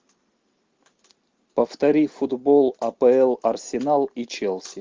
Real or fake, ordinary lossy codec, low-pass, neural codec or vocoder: real; Opus, 32 kbps; 7.2 kHz; none